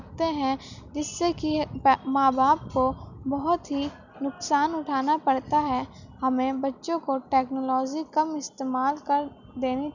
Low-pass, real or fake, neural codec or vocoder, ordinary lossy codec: 7.2 kHz; real; none; none